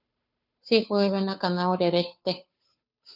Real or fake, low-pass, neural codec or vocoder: fake; 5.4 kHz; codec, 16 kHz, 2 kbps, FunCodec, trained on Chinese and English, 25 frames a second